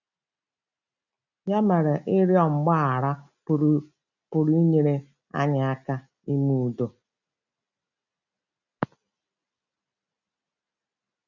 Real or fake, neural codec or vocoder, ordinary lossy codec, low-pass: real; none; none; 7.2 kHz